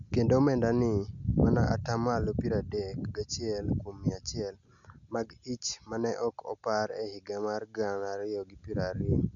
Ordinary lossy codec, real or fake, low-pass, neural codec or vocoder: MP3, 96 kbps; real; 7.2 kHz; none